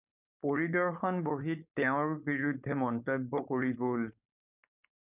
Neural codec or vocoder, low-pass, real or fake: codec, 16 kHz, 4.8 kbps, FACodec; 3.6 kHz; fake